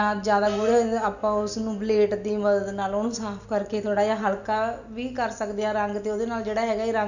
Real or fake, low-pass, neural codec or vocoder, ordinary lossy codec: real; 7.2 kHz; none; none